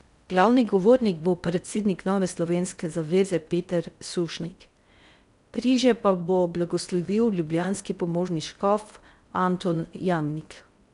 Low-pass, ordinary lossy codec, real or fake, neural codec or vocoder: 10.8 kHz; none; fake; codec, 16 kHz in and 24 kHz out, 0.6 kbps, FocalCodec, streaming, 4096 codes